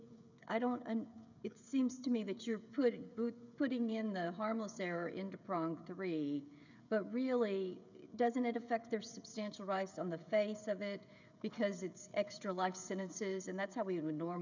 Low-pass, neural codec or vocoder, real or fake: 7.2 kHz; codec, 16 kHz, 16 kbps, FreqCodec, smaller model; fake